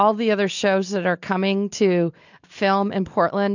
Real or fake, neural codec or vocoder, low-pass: real; none; 7.2 kHz